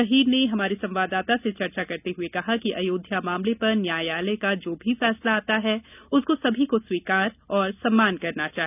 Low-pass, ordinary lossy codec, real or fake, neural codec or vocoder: 3.6 kHz; none; real; none